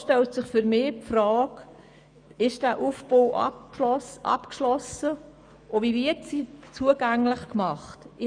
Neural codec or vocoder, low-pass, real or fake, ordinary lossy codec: autoencoder, 48 kHz, 128 numbers a frame, DAC-VAE, trained on Japanese speech; 9.9 kHz; fake; none